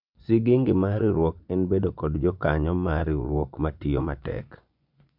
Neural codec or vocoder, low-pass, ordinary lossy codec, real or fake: vocoder, 24 kHz, 100 mel bands, Vocos; 5.4 kHz; none; fake